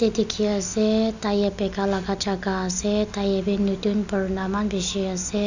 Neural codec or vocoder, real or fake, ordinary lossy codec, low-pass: none; real; none; 7.2 kHz